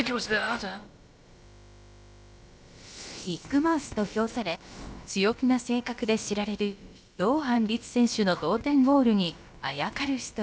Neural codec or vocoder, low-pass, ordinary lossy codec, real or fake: codec, 16 kHz, about 1 kbps, DyCAST, with the encoder's durations; none; none; fake